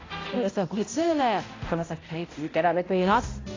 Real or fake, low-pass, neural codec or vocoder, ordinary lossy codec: fake; 7.2 kHz; codec, 16 kHz, 0.5 kbps, X-Codec, HuBERT features, trained on balanced general audio; AAC, 32 kbps